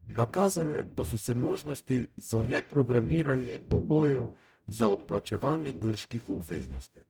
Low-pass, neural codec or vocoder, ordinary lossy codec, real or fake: none; codec, 44.1 kHz, 0.9 kbps, DAC; none; fake